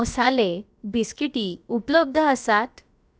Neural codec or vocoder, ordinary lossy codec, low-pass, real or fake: codec, 16 kHz, about 1 kbps, DyCAST, with the encoder's durations; none; none; fake